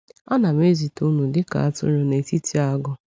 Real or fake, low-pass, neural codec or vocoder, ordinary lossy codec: real; none; none; none